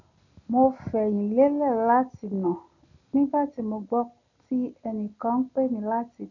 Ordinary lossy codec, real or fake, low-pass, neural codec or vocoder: none; real; 7.2 kHz; none